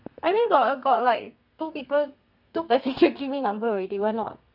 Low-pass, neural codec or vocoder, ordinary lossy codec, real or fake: 5.4 kHz; codec, 32 kHz, 1.9 kbps, SNAC; none; fake